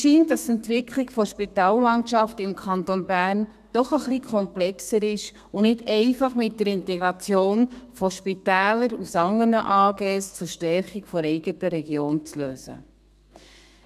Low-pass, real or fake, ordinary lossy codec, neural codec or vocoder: 14.4 kHz; fake; none; codec, 32 kHz, 1.9 kbps, SNAC